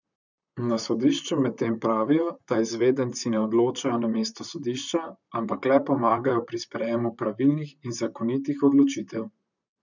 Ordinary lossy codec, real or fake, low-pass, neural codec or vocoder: none; fake; 7.2 kHz; vocoder, 44.1 kHz, 128 mel bands, Pupu-Vocoder